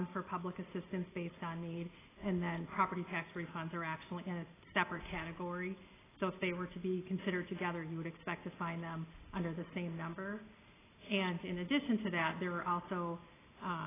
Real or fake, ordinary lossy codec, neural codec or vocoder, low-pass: real; AAC, 16 kbps; none; 3.6 kHz